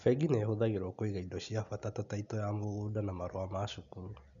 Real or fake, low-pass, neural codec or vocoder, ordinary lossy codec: real; 7.2 kHz; none; none